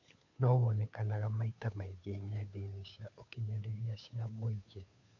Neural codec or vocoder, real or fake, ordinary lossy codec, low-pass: codec, 16 kHz, 2 kbps, FunCodec, trained on Chinese and English, 25 frames a second; fake; none; 7.2 kHz